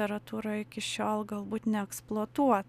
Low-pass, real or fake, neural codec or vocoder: 14.4 kHz; real; none